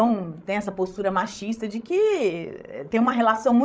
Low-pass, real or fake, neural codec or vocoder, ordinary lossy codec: none; fake; codec, 16 kHz, 16 kbps, FreqCodec, larger model; none